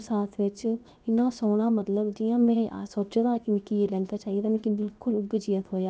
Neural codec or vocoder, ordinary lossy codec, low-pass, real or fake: codec, 16 kHz, 0.7 kbps, FocalCodec; none; none; fake